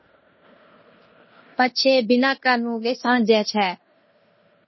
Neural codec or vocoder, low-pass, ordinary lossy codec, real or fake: codec, 16 kHz in and 24 kHz out, 0.9 kbps, LongCat-Audio-Codec, fine tuned four codebook decoder; 7.2 kHz; MP3, 24 kbps; fake